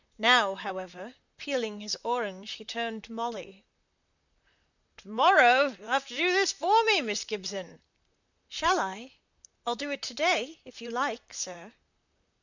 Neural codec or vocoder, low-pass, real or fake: vocoder, 44.1 kHz, 128 mel bands, Pupu-Vocoder; 7.2 kHz; fake